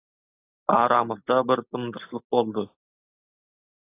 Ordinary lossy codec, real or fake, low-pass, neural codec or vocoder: AAC, 24 kbps; real; 3.6 kHz; none